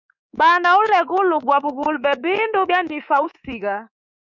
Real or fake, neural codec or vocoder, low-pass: fake; codec, 24 kHz, 3.1 kbps, DualCodec; 7.2 kHz